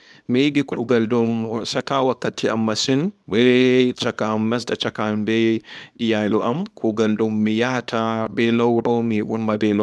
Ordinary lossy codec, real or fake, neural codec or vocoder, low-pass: none; fake; codec, 24 kHz, 0.9 kbps, WavTokenizer, small release; none